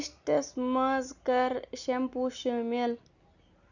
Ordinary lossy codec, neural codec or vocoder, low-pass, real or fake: none; none; 7.2 kHz; real